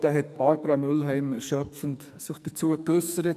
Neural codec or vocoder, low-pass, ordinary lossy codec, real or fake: codec, 44.1 kHz, 2.6 kbps, SNAC; 14.4 kHz; none; fake